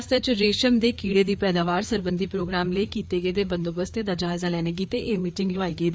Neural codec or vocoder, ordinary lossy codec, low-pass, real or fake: codec, 16 kHz, 4 kbps, FreqCodec, larger model; none; none; fake